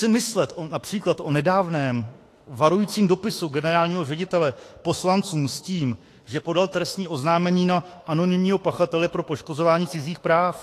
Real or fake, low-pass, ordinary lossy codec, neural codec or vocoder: fake; 14.4 kHz; AAC, 48 kbps; autoencoder, 48 kHz, 32 numbers a frame, DAC-VAE, trained on Japanese speech